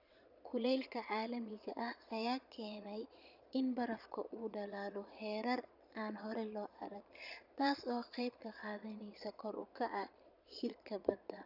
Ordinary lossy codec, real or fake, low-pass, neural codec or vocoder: none; fake; 5.4 kHz; vocoder, 22.05 kHz, 80 mel bands, Vocos